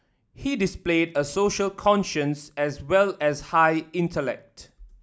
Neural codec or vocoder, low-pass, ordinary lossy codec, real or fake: none; none; none; real